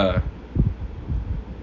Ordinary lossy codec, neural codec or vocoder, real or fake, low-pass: none; none; real; 7.2 kHz